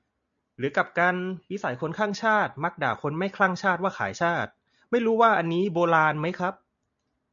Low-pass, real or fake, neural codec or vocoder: 7.2 kHz; real; none